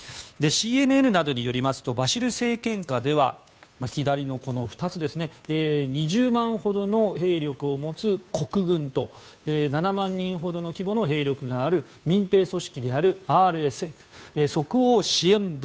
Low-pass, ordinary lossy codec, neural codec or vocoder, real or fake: none; none; codec, 16 kHz, 2 kbps, FunCodec, trained on Chinese and English, 25 frames a second; fake